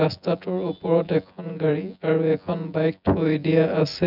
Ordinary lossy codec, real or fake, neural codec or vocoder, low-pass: none; fake; vocoder, 24 kHz, 100 mel bands, Vocos; 5.4 kHz